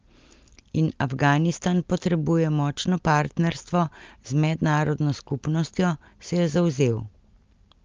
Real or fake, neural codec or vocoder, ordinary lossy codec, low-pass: real; none; Opus, 24 kbps; 7.2 kHz